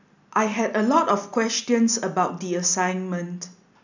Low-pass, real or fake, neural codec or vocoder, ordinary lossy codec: 7.2 kHz; real; none; none